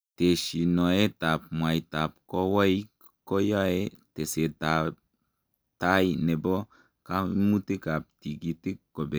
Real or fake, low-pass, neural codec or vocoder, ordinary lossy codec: real; none; none; none